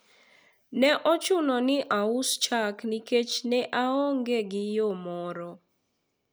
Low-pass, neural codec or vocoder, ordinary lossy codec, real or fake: none; none; none; real